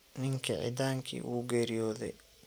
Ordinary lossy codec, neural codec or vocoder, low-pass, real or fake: none; none; none; real